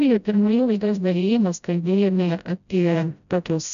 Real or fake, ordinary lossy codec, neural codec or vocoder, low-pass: fake; MP3, 96 kbps; codec, 16 kHz, 0.5 kbps, FreqCodec, smaller model; 7.2 kHz